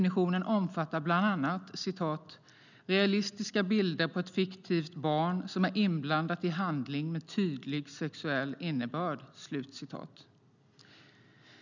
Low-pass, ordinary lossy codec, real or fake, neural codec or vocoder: 7.2 kHz; none; real; none